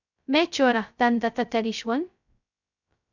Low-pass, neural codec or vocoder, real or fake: 7.2 kHz; codec, 16 kHz, 0.2 kbps, FocalCodec; fake